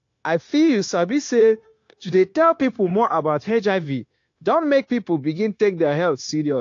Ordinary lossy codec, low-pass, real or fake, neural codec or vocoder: AAC, 48 kbps; 7.2 kHz; fake; codec, 16 kHz, 0.9 kbps, LongCat-Audio-Codec